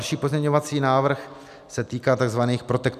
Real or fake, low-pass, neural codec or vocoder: real; 14.4 kHz; none